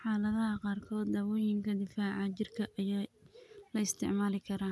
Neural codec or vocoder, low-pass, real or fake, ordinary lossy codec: none; none; real; none